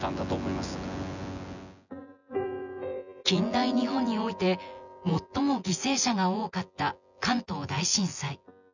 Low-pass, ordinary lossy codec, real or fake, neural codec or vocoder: 7.2 kHz; none; fake; vocoder, 24 kHz, 100 mel bands, Vocos